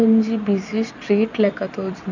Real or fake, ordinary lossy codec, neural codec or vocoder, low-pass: fake; none; vocoder, 44.1 kHz, 128 mel bands every 256 samples, BigVGAN v2; 7.2 kHz